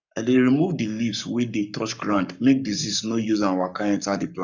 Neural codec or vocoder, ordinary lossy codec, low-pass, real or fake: codec, 44.1 kHz, 7.8 kbps, Pupu-Codec; none; 7.2 kHz; fake